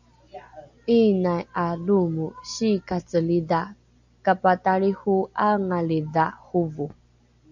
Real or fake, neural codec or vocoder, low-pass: real; none; 7.2 kHz